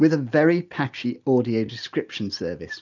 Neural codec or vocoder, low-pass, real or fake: none; 7.2 kHz; real